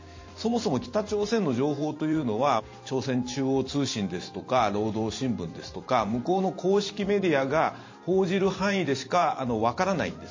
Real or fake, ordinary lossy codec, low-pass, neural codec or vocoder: fake; MP3, 32 kbps; 7.2 kHz; vocoder, 44.1 kHz, 128 mel bands every 512 samples, BigVGAN v2